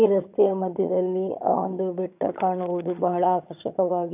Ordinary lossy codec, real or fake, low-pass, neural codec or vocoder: none; fake; 3.6 kHz; vocoder, 22.05 kHz, 80 mel bands, HiFi-GAN